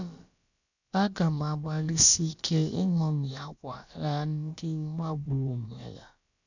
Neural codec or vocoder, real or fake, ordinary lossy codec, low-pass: codec, 16 kHz, about 1 kbps, DyCAST, with the encoder's durations; fake; none; 7.2 kHz